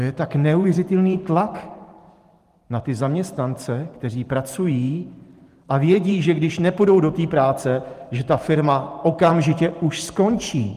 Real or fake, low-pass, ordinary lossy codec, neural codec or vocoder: fake; 14.4 kHz; Opus, 24 kbps; vocoder, 44.1 kHz, 128 mel bands every 512 samples, BigVGAN v2